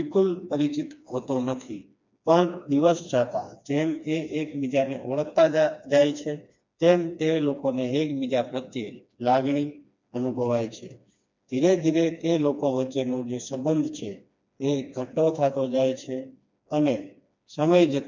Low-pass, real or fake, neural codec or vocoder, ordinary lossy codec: 7.2 kHz; fake; codec, 16 kHz, 2 kbps, FreqCodec, smaller model; MP3, 64 kbps